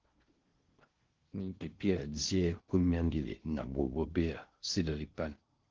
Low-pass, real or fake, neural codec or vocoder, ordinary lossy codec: 7.2 kHz; fake; codec, 16 kHz in and 24 kHz out, 0.6 kbps, FocalCodec, streaming, 4096 codes; Opus, 16 kbps